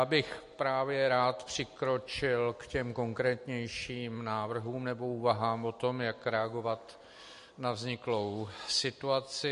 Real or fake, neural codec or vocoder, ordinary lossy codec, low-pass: real; none; MP3, 48 kbps; 14.4 kHz